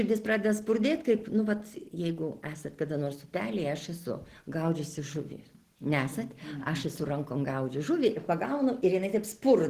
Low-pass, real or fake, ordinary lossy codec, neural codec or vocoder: 14.4 kHz; real; Opus, 16 kbps; none